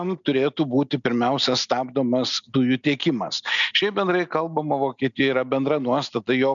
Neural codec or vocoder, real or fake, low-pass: none; real; 7.2 kHz